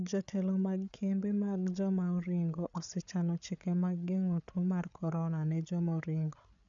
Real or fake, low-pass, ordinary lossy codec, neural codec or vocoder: fake; 7.2 kHz; none; codec, 16 kHz, 8 kbps, FreqCodec, larger model